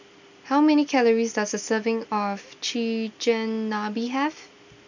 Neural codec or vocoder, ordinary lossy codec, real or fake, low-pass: none; none; real; 7.2 kHz